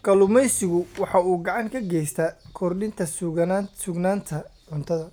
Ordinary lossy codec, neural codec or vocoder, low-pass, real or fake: none; none; none; real